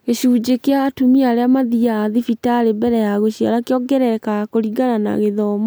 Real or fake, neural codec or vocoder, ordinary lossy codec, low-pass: real; none; none; none